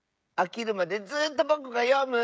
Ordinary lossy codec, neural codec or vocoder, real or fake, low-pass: none; codec, 16 kHz, 16 kbps, FreqCodec, smaller model; fake; none